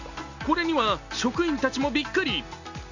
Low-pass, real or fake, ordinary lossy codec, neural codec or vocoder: 7.2 kHz; real; none; none